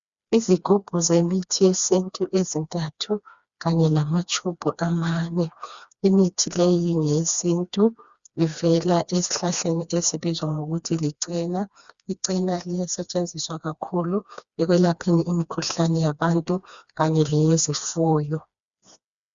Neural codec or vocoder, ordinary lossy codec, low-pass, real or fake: codec, 16 kHz, 2 kbps, FreqCodec, smaller model; Opus, 64 kbps; 7.2 kHz; fake